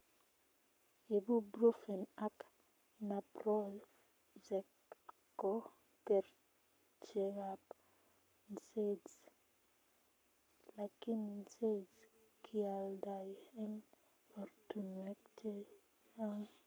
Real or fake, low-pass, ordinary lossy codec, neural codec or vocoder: fake; none; none; codec, 44.1 kHz, 7.8 kbps, Pupu-Codec